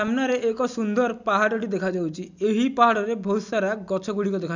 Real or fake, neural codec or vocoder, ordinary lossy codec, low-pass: real; none; none; 7.2 kHz